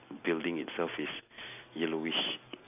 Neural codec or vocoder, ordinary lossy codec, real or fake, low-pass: none; none; real; 3.6 kHz